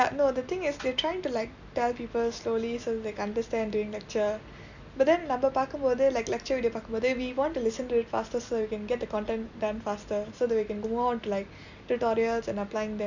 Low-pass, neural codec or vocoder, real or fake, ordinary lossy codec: 7.2 kHz; none; real; none